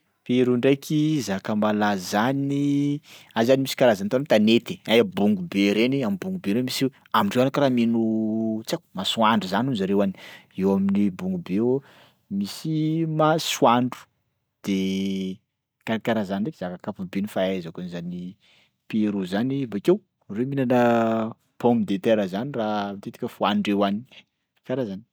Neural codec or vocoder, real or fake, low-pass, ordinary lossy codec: none; real; none; none